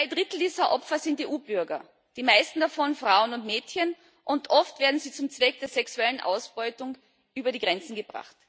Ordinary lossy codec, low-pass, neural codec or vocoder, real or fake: none; none; none; real